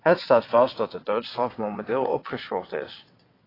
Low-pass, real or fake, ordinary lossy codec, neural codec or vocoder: 5.4 kHz; fake; AAC, 24 kbps; vocoder, 22.05 kHz, 80 mel bands, WaveNeXt